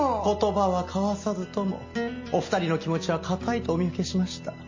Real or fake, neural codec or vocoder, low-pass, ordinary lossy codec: real; none; 7.2 kHz; MP3, 32 kbps